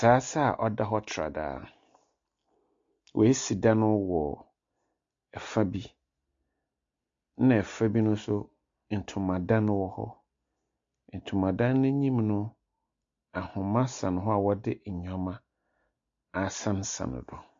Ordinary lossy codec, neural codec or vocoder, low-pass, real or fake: MP3, 48 kbps; none; 7.2 kHz; real